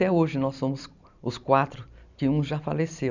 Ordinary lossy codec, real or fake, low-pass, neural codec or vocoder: none; fake; 7.2 kHz; vocoder, 44.1 kHz, 128 mel bands every 256 samples, BigVGAN v2